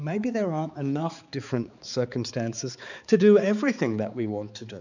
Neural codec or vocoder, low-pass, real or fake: codec, 16 kHz, 4 kbps, X-Codec, HuBERT features, trained on balanced general audio; 7.2 kHz; fake